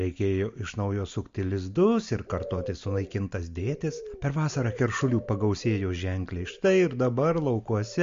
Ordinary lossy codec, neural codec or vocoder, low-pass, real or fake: MP3, 48 kbps; none; 7.2 kHz; real